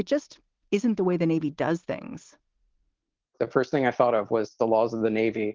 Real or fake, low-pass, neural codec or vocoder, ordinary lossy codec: real; 7.2 kHz; none; Opus, 16 kbps